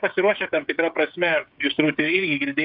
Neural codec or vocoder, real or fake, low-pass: codec, 16 kHz, 8 kbps, FreqCodec, smaller model; fake; 5.4 kHz